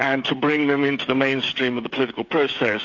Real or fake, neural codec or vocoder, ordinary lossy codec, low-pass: fake; codec, 16 kHz, 16 kbps, FreqCodec, smaller model; AAC, 48 kbps; 7.2 kHz